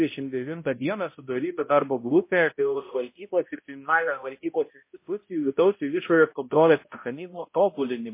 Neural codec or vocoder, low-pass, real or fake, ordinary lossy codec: codec, 16 kHz, 0.5 kbps, X-Codec, HuBERT features, trained on balanced general audio; 3.6 kHz; fake; MP3, 24 kbps